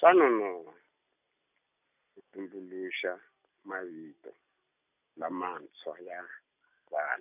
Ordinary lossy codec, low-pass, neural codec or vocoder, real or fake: none; 3.6 kHz; none; real